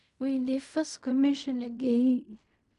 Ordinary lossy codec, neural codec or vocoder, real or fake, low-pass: none; codec, 16 kHz in and 24 kHz out, 0.4 kbps, LongCat-Audio-Codec, fine tuned four codebook decoder; fake; 10.8 kHz